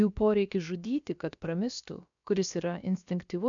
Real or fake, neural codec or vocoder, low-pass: fake; codec, 16 kHz, about 1 kbps, DyCAST, with the encoder's durations; 7.2 kHz